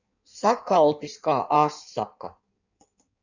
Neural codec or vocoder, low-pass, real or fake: codec, 16 kHz in and 24 kHz out, 1.1 kbps, FireRedTTS-2 codec; 7.2 kHz; fake